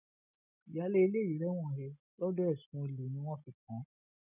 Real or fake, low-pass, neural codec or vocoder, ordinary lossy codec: real; 3.6 kHz; none; none